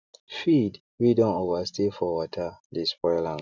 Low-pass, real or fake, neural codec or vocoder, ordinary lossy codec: 7.2 kHz; real; none; none